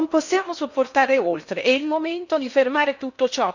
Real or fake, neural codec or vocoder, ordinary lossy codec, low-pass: fake; codec, 16 kHz in and 24 kHz out, 0.6 kbps, FocalCodec, streaming, 4096 codes; none; 7.2 kHz